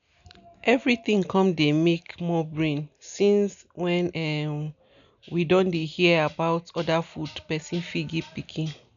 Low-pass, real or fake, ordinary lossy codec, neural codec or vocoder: 7.2 kHz; real; none; none